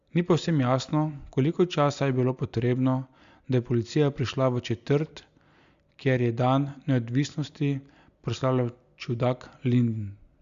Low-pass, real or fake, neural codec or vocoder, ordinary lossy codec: 7.2 kHz; real; none; Opus, 64 kbps